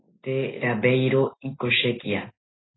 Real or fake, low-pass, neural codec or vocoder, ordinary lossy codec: real; 7.2 kHz; none; AAC, 16 kbps